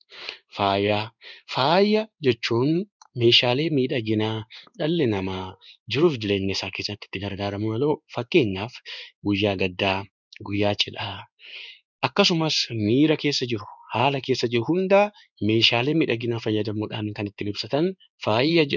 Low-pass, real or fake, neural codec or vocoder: 7.2 kHz; fake; codec, 16 kHz in and 24 kHz out, 1 kbps, XY-Tokenizer